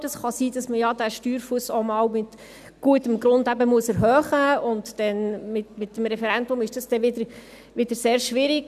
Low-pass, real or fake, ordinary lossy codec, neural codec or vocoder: 14.4 kHz; real; none; none